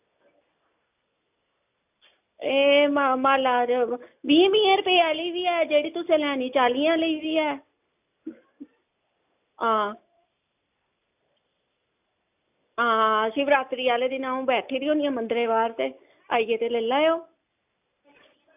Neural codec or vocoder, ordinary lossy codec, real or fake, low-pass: none; AAC, 32 kbps; real; 3.6 kHz